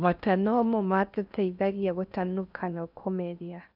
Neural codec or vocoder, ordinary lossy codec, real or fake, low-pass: codec, 16 kHz in and 24 kHz out, 0.6 kbps, FocalCodec, streaming, 4096 codes; none; fake; 5.4 kHz